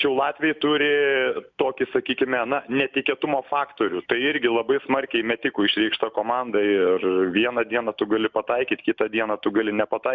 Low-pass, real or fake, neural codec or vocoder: 7.2 kHz; real; none